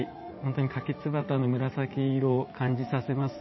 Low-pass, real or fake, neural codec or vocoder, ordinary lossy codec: 7.2 kHz; fake; vocoder, 44.1 kHz, 80 mel bands, Vocos; MP3, 24 kbps